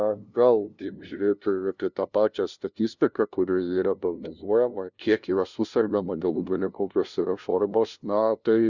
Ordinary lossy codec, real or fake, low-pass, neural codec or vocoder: Opus, 64 kbps; fake; 7.2 kHz; codec, 16 kHz, 0.5 kbps, FunCodec, trained on LibriTTS, 25 frames a second